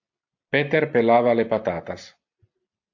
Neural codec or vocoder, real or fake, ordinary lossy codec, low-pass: none; real; AAC, 48 kbps; 7.2 kHz